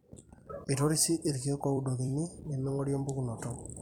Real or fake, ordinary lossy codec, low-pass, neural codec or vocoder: fake; none; 19.8 kHz; vocoder, 44.1 kHz, 128 mel bands every 256 samples, BigVGAN v2